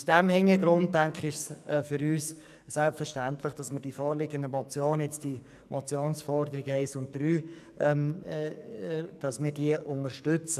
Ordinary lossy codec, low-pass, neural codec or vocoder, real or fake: none; 14.4 kHz; codec, 44.1 kHz, 2.6 kbps, SNAC; fake